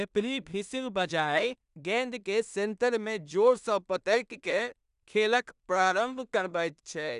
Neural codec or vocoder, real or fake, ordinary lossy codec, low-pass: codec, 16 kHz in and 24 kHz out, 0.4 kbps, LongCat-Audio-Codec, two codebook decoder; fake; none; 10.8 kHz